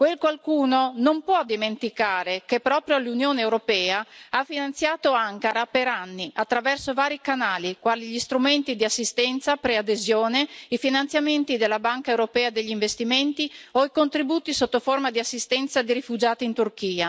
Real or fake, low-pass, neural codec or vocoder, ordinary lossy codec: real; none; none; none